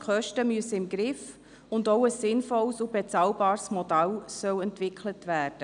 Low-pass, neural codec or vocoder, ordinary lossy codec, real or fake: 9.9 kHz; none; none; real